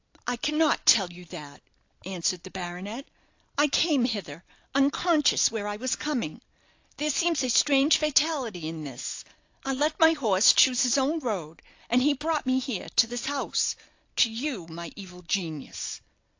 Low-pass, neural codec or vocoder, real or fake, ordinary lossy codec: 7.2 kHz; none; real; AAC, 48 kbps